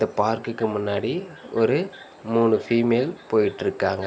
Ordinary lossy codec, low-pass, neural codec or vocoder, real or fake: none; none; none; real